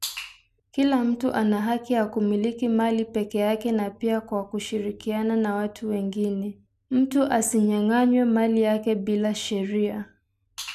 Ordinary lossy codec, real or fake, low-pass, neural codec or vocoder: none; real; 14.4 kHz; none